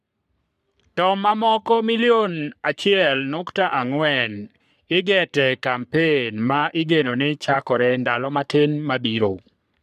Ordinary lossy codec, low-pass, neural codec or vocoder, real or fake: none; 14.4 kHz; codec, 44.1 kHz, 3.4 kbps, Pupu-Codec; fake